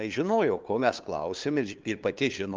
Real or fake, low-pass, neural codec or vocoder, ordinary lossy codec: fake; 7.2 kHz; codec, 16 kHz, 2 kbps, FunCodec, trained on LibriTTS, 25 frames a second; Opus, 24 kbps